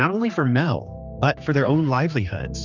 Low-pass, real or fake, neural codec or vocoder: 7.2 kHz; fake; codec, 16 kHz, 2 kbps, X-Codec, HuBERT features, trained on general audio